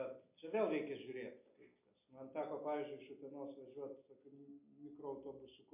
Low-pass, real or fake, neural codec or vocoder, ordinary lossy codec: 3.6 kHz; real; none; MP3, 32 kbps